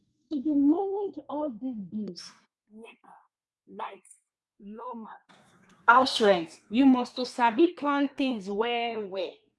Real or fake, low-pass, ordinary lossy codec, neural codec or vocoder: fake; none; none; codec, 24 kHz, 1 kbps, SNAC